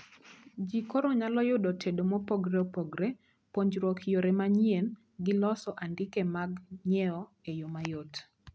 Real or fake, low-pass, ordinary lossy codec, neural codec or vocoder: real; none; none; none